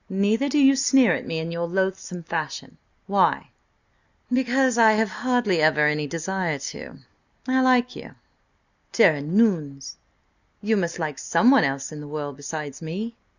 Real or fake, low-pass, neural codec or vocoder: real; 7.2 kHz; none